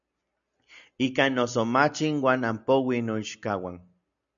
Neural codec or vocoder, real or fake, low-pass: none; real; 7.2 kHz